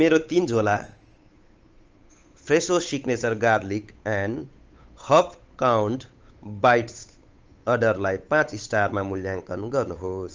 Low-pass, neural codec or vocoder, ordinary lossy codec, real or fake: 7.2 kHz; codec, 16 kHz, 8 kbps, FunCodec, trained on Chinese and English, 25 frames a second; Opus, 24 kbps; fake